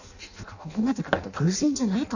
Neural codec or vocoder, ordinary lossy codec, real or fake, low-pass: codec, 16 kHz in and 24 kHz out, 0.6 kbps, FireRedTTS-2 codec; none; fake; 7.2 kHz